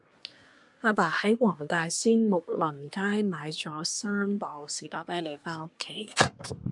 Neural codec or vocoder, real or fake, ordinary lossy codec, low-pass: codec, 24 kHz, 1 kbps, SNAC; fake; MP3, 96 kbps; 10.8 kHz